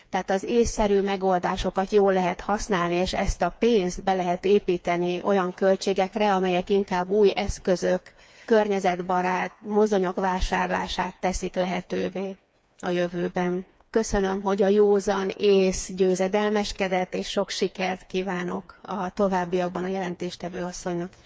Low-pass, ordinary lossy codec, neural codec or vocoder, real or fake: none; none; codec, 16 kHz, 4 kbps, FreqCodec, smaller model; fake